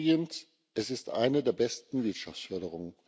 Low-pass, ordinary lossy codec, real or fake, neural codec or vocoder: none; none; real; none